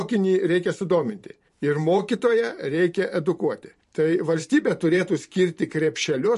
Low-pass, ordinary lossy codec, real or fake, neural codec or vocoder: 14.4 kHz; MP3, 48 kbps; fake; vocoder, 44.1 kHz, 128 mel bands every 512 samples, BigVGAN v2